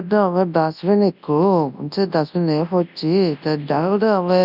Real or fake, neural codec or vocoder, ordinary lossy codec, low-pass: fake; codec, 24 kHz, 0.9 kbps, WavTokenizer, large speech release; none; 5.4 kHz